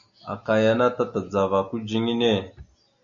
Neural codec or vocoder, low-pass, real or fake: none; 7.2 kHz; real